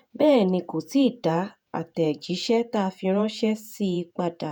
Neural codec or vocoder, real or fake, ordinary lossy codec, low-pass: vocoder, 48 kHz, 128 mel bands, Vocos; fake; none; none